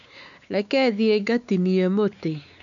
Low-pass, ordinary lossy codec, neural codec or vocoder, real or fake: 7.2 kHz; none; codec, 16 kHz, 4 kbps, X-Codec, WavLM features, trained on Multilingual LibriSpeech; fake